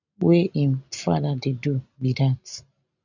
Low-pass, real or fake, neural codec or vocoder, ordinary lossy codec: 7.2 kHz; real; none; none